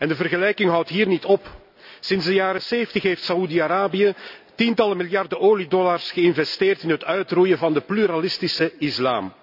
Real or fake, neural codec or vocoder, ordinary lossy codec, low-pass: real; none; none; 5.4 kHz